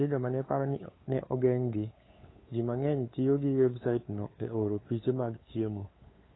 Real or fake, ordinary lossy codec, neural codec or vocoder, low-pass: fake; AAC, 16 kbps; codec, 16 kHz, 8 kbps, FunCodec, trained on LibriTTS, 25 frames a second; 7.2 kHz